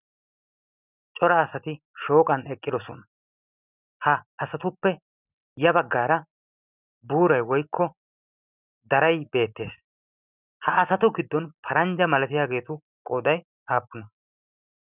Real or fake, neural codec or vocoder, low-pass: real; none; 3.6 kHz